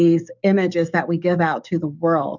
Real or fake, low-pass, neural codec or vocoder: fake; 7.2 kHz; codec, 44.1 kHz, 7.8 kbps, DAC